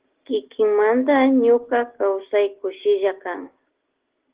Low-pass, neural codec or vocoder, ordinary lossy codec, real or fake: 3.6 kHz; none; Opus, 16 kbps; real